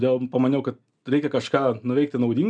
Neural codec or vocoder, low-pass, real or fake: none; 9.9 kHz; real